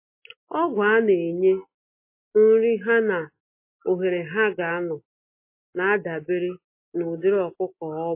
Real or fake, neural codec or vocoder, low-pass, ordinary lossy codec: real; none; 3.6 kHz; MP3, 24 kbps